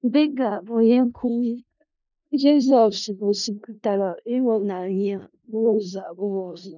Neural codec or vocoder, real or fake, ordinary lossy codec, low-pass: codec, 16 kHz in and 24 kHz out, 0.4 kbps, LongCat-Audio-Codec, four codebook decoder; fake; none; 7.2 kHz